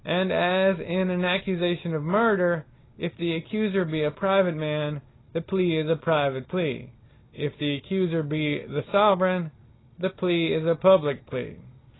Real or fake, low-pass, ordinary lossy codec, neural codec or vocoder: real; 7.2 kHz; AAC, 16 kbps; none